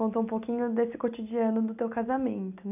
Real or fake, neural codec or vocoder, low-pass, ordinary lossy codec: real; none; 3.6 kHz; none